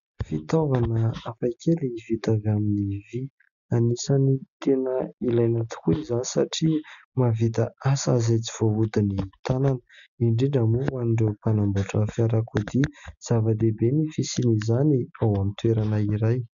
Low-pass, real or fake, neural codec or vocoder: 7.2 kHz; real; none